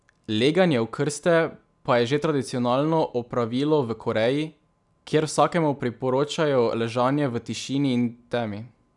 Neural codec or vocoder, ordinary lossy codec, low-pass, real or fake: none; none; 10.8 kHz; real